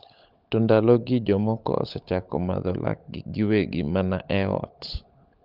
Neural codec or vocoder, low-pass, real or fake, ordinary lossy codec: codec, 16 kHz, 16 kbps, FunCodec, trained on LibriTTS, 50 frames a second; 5.4 kHz; fake; Opus, 24 kbps